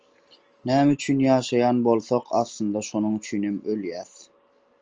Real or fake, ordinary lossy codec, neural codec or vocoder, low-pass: real; Opus, 24 kbps; none; 7.2 kHz